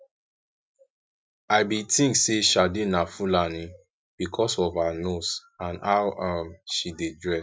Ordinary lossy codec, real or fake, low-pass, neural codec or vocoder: none; real; none; none